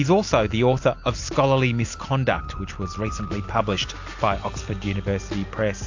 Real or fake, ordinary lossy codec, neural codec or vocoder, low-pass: real; AAC, 48 kbps; none; 7.2 kHz